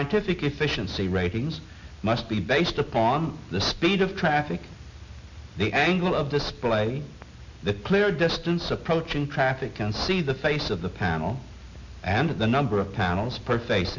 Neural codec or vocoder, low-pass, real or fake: none; 7.2 kHz; real